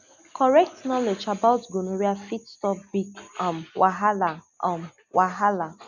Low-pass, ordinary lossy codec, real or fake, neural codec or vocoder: 7.2 kHz; none; real; none